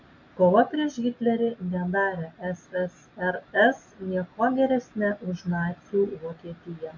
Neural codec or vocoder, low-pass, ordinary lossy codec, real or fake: none; 7.2 kHz; MP3, 64 kbps; real